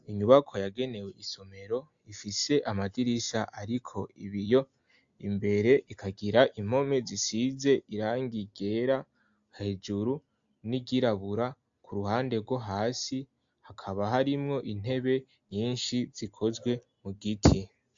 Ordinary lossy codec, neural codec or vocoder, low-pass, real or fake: AAC, 64 kbps; none; 7.2 kHz; real